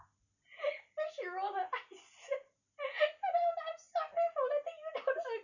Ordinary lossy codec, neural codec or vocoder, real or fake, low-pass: none; none; real; 7.2 kHz